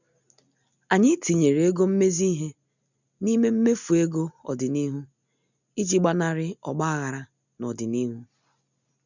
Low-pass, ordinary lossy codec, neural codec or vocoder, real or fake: 7.2 kHz; none; none; real